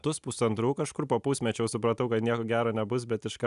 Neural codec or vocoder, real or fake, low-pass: none; real; 10.8 kHz